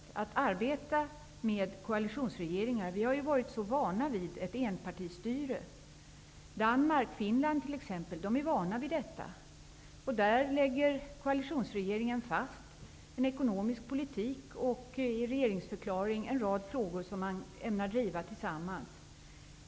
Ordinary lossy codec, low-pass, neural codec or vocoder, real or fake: none; none; none; real